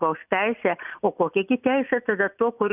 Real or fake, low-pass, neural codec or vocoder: real; 3.6 kHz; none